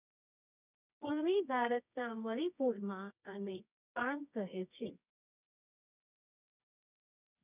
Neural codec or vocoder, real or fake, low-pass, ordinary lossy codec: codec, 24 kHz, 0.9 kbps, WavTokenizer, medium music audio release; fake; 3.6 kHz; none